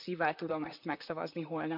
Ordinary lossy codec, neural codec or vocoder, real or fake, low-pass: none; vocoder, 44.1 kHz, 128 mel bands, Pupu-Vocoder; fake; 5.4 kHz